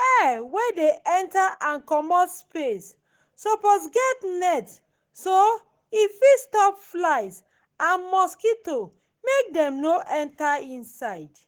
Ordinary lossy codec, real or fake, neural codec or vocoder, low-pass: Opus, 16 kbps; fake; codec, 44.1 kHz, 7.8 kbps, Pupu-Codec; 19.8 kHz